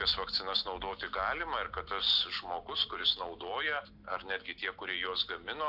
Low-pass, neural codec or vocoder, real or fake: 5.4 kHz; none; real